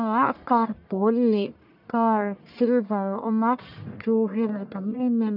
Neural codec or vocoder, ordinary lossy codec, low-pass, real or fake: codec, 44.1 kHz, 1.7 kbps, Pupu-Codec; none; 5.4 kHz; fake